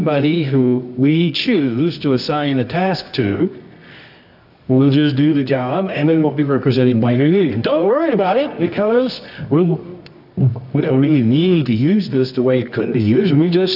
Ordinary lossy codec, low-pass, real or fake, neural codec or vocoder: AAC, 48 kbps; 5.4 kHz; fake; codec, 24 kHz, 0.9 kbps, WavTokenizer, medium music audio release